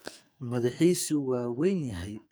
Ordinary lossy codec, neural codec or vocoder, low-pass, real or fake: none; codec, 44.1 kHz, 2.6 kbps, SNAC; none; fake